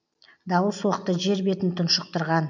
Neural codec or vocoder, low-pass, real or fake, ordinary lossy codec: none; 7.2 kHz; real; none